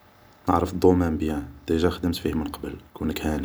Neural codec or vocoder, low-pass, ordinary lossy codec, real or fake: none; none; none; real